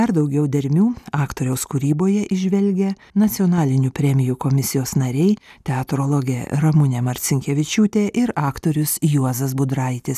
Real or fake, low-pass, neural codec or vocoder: real; 14.4 kHz; none